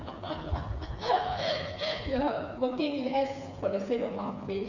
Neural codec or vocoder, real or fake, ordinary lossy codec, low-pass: codec, 16 kHz, 4 kbps, FreqCodec, smaller model; fake; none; 7.2 kHz